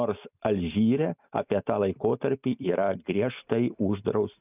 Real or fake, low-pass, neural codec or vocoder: real; 3.6 kHz; none